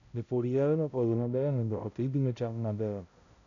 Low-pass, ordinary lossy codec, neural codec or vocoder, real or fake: 7.2 kHz; AAC, 96 kbps; codec, 16 kHz, 0.5 kbps, X-Codec, HuBERT features, trained on balanced general audio; fake